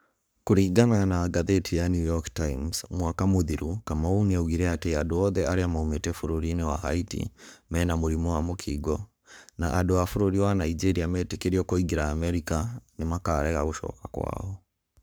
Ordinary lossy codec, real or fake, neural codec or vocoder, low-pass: none; fake; codec, 44.1 kHz, 7.8 kbps, Pupu-Codec; none